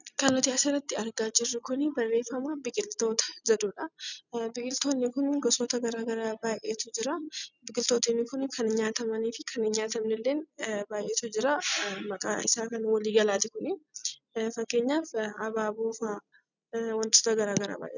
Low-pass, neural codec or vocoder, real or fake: 7.2 kHz; none; real